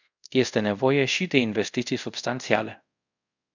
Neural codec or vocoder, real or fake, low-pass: codec, 24 kHz, 0.5 kbps, DualCodec; fake; 7.2 kHz